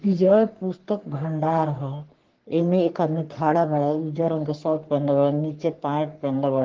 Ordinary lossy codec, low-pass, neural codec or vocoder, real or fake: Opus, 16 kbps; 7.2 kHz; codec, 44.1 kHz, 3.4 kbps, Pupu-Codec; fake